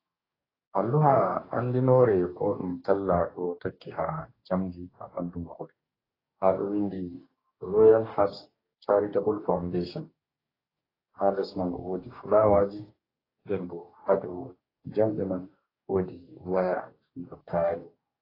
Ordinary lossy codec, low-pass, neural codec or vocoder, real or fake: AAC, 24 kbps; 5.4 kHz; codec, 44.1 kHz, 2.6 kbps, DAC; fake